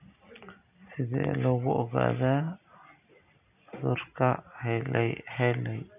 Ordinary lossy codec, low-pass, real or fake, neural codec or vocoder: none; 3.6 kHz; real; none